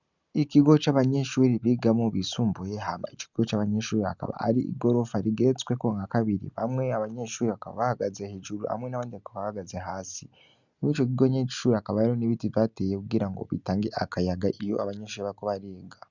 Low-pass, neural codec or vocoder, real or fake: 7.2 kHz; none; real